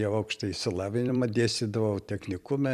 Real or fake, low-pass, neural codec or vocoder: fake; 14.4 kHz; vocoder, 44.1 kHz, 128 mel bands every 256 samples, BigVGAN v2